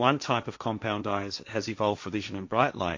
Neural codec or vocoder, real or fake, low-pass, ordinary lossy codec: codec, 16 kHz, 1.1 kbps, Voila-Tokenizer; fake; 7.2 kHz; MP3, 48 kbps